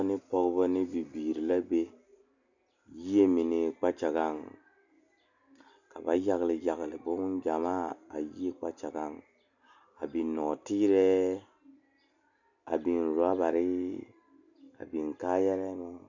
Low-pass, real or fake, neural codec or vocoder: 7.2 kHz; real; none